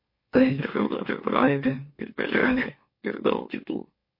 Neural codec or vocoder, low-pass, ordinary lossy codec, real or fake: autoencoder, 44.1 kHz, a latent of 192 numbers a frame, MeloTTS; 5.4 kHz; MP3, 32 kbps; fake